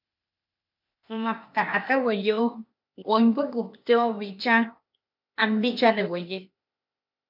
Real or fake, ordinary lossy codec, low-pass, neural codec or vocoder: fake; MP3, 48 kbps; 5.4 kHz; codec, 16 kHz, 0.8 kbps, ZipCodec